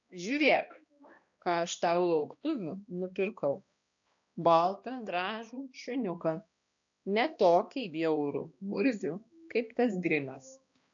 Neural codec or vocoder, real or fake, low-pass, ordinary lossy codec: codec, 16 kHz, 1 kbps, X-Codec, HuBERT features, trained on balanced general audio; fake; 7.2 kHz; AAC, 64 kbps